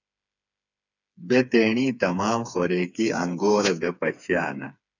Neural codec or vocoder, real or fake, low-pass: codec, 16 kHz, 4 kbps, FreqCodec, smaller model; fake; 7.2 kHz